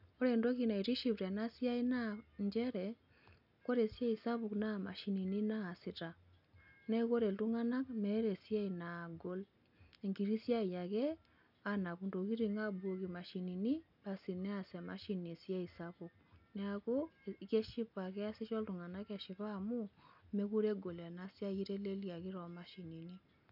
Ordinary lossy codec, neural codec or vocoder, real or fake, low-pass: none; none; real; 5.4 kHz